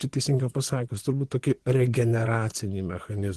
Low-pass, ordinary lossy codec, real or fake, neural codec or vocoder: 10.8 kHz; Opus, 16 kbps; fake; vocoder, 24 kHz, 100 mel bands, Vocos